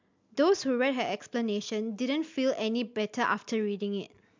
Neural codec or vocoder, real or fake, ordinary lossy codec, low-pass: none; real; MP3, 64 kbps; 7.2 kHz